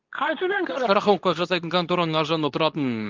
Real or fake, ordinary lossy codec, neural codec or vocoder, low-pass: fake; Opus, 32 kbps; codec, 24 kHz, 0.9 kbps, WavTokenizer, medium speech release version 2; 7.2 kHz